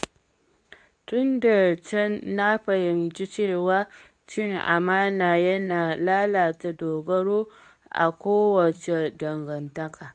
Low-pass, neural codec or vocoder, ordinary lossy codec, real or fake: 9.9 kHz; codec, 24 kHz, 0.9 kbps, WavTokenizer, medium speech release version 2; none; fake